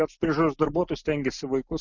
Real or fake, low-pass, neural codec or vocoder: real; 7.2 kHz; none